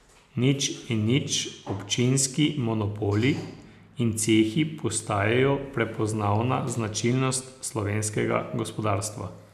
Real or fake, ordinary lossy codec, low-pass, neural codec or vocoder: real; none; 14.4 kHz; none